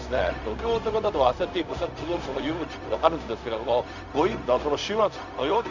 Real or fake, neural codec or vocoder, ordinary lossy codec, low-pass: fake; codec, 16 kHz, 0.4 kbps, LongCat-Audio-Codec; none; 7.2 kHz